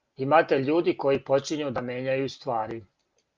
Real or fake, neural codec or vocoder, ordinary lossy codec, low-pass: real; none; Opus, 24 kbps; 7.2 kHz